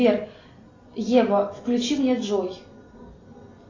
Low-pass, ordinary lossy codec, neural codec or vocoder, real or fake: 7.2 kHz; AAC, 32 kbps; none; real